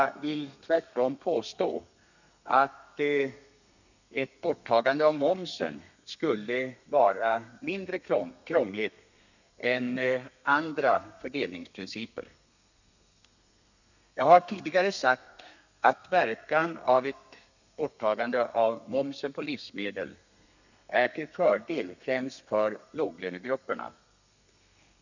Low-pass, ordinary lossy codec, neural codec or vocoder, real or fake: 7.2 kHz; none; codec, 32 kHz, 1.9 kbps, SNAC; fake